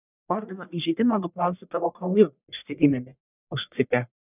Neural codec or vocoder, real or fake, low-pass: codec, 44.1 kHz, 1.7 kbps, Pupu-Codec; fake; 3.6 kHz